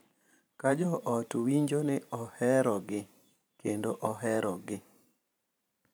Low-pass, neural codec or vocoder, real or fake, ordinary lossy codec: none; vocoder, 44.1 kHz, 128 mel bands every 512 samples, BigVGAN v2; fake; none